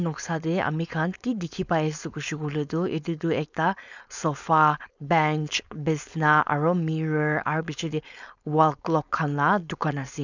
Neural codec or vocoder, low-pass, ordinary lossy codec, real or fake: codec, 16 kHz, 4.8 kbps, FACodec; 7.2 kHz; none; fake